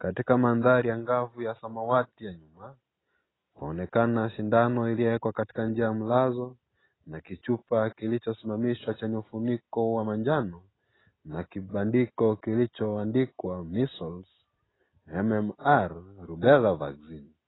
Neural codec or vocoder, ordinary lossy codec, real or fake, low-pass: none; AAC, 16 kbps; real; 7.2 kHz